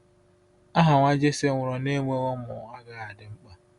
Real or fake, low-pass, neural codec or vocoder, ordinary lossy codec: real; 10.8 kHz; none; Opus, 64 kbps